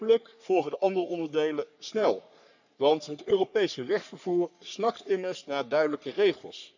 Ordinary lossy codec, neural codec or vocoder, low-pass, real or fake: none; codec, 44.1 kHz, 3.4 kbps, Pupu-Codec; 7.2 kHz; fake